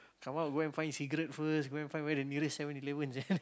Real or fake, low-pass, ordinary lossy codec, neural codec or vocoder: real; none; none; none